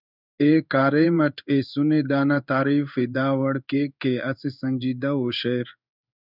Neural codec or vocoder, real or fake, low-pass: codec, 16 kHz in and 24 kHz out, 1 kbps, XY-Tokenizer; fake; 5.4 kHz